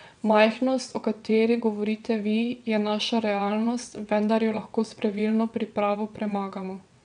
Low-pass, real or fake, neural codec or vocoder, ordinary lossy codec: 9.9 kHz; fake; vocoder, 22.05 kHz, 80 mel bands, WaveNeXt; none